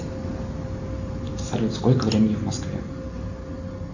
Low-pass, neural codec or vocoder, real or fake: 7.2 kHz; none; real